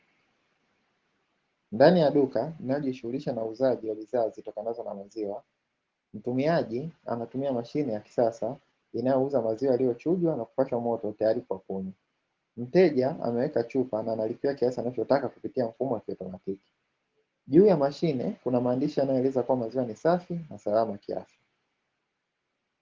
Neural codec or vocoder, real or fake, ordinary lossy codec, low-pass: none; real; Opus, 16 kbps; 7.2 kHz